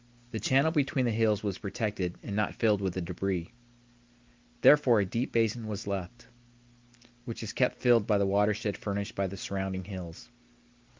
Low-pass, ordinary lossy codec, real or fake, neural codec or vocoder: 7.2 kHz; Opus, 32 kbps; real; none